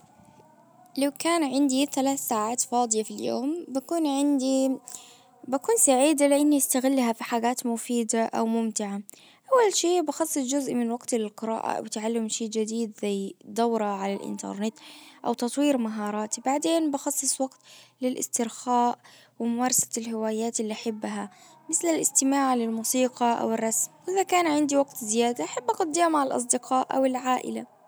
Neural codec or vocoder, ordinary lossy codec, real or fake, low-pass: none; none; real; none